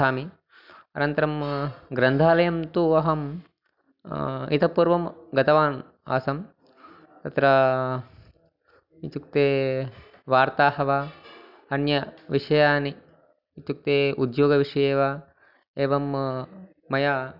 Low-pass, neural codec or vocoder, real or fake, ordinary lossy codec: 5.4 kHz; none; real; none